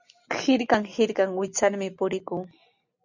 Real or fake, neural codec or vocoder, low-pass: real; none; 7.2 kHz